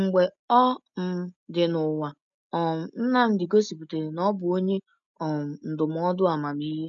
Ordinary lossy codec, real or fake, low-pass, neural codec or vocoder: none; real; 7.2 kHz; none